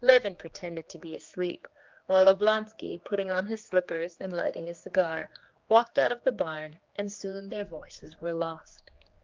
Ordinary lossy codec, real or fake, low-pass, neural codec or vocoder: Opus, 32 kbps; fake; 7.2 kHz; codec, 16 kHz, 2 kbps, X-Codec, HuBERT features, trained on general audio